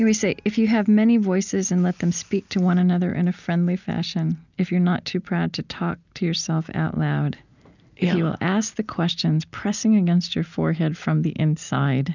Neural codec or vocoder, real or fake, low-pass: none; real; 7.2 kHz